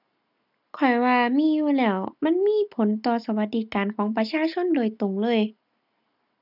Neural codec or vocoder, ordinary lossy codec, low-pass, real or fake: none; none; 5.4 kHz; real